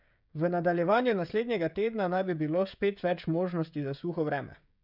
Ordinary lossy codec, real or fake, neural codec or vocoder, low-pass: none; fake; codec, 16 kHz, 16 kbps, FreqCodec, smaller model; 5.4 kHz